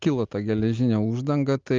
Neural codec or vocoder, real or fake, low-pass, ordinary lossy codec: none; real; 7.2 kHz; Opus, 32 kbps